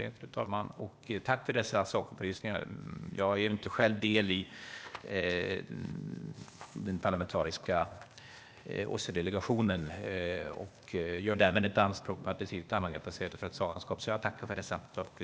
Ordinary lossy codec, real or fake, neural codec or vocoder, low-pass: none; fake; codec, 16 kHz, 0.8 kbps, ZipCodec; none